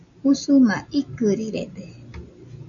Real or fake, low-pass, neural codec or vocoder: real; 7.2 kHz; none